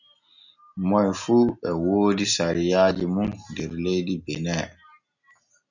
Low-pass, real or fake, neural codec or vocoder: 7.2 kHz; real; none